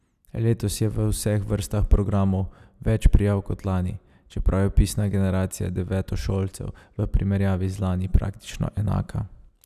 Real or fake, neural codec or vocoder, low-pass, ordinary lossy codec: real; none; 14.4 kHz; none